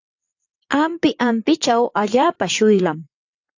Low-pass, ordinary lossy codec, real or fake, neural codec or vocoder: 7.2 kHz; AAC, 48 kbps; fake; vocoder, 22.05 kHz, 80 mel bands, WaveNeXt